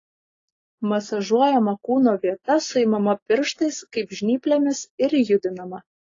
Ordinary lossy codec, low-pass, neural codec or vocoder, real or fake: AAC, 32 kbps; 7.2 kHz; none; real